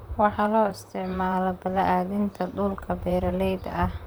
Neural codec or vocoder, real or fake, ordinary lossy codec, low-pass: vocoder, 44.1 kHz, 128 mel bands, Pupu-Vocoder; fake; none; none